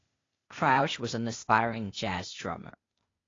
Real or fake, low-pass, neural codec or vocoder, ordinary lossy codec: fake; 7.2 kHz; codec, 16 kHz, 0.8 kbps, ZipCodec; AAC, 32 kbps